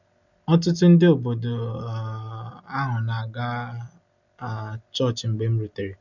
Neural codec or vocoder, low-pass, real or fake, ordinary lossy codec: none; 7.2 kHz; real; none